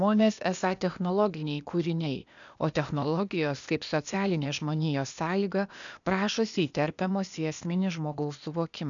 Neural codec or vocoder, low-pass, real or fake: codec, 16 kHz, 0.8 kbps, ZipCodec; 7.2 kHz; fake